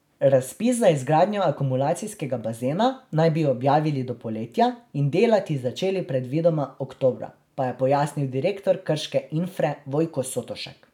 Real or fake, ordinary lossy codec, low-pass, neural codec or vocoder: real; none; 19.8 kHz; none